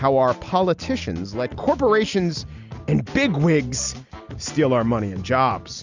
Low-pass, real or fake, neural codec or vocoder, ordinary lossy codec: 7.2 kHz; real; none; Opus, 64 kbps